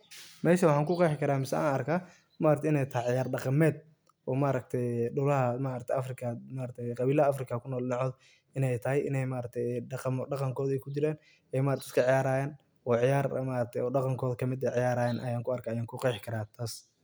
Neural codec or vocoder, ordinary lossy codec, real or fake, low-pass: none; none; real; none